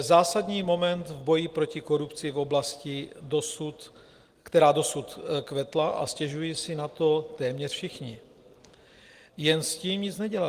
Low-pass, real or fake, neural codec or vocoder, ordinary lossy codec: 14.4 kHz; real; none; Opus, 24 kbps